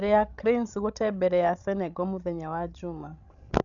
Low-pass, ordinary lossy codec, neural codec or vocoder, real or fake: 7.2 kHz; none; codec, 16 kHz, 16 kbps, FreqCodec, larger model; fake